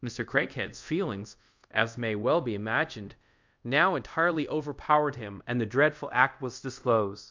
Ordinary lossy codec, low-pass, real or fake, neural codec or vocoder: MP3, 64 kbps; 7.2 kHz; fake; codec, 24 kHz, 0.5 kbps, DualCodec